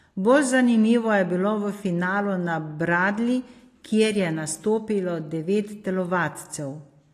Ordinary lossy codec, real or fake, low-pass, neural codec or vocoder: AAC, 48 kbps; real; 14.4 kHz; none